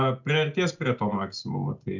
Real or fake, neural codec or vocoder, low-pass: real; none; 7.2 kHz